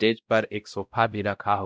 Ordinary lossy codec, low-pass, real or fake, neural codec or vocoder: none; none; fake; codec, 16 kHz, 0.5 kbps, X-Codec, WavLM features, trained on Multilingual LibriSpeech